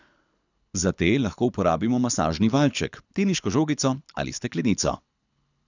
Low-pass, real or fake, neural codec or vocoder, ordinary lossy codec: 7.2 kHz; fake; codec, 24 kHz, 6 kbps, HILCodec; none